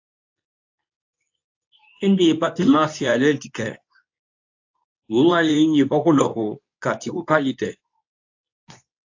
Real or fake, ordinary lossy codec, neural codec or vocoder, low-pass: fake; AAC, 48 kbps; codec, 24 kHz, 0.9 kbps, WavTokenizer, medium speech release version 2; 7.2 kHz